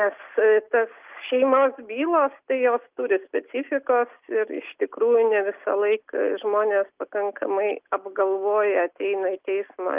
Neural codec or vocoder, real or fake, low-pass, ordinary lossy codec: none; real; 3.6 kHz; Opus, 32 kbps